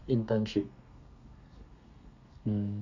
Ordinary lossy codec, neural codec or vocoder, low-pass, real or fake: none; codec, 32 kHz, 1.9 kbps, SNAC; 7.2 kHz; fake